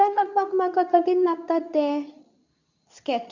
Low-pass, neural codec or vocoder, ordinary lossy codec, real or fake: 7.2 kHz; codec, 24 kHz, 0.9 kbps, WavTokenizer, medium speech release version 2; none; fake